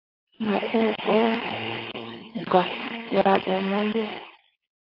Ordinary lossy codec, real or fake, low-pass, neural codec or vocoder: AAC, 24 kbps; fake; 5.4 kHz; codec, 16 kHz, 4.8 kbps, FACodec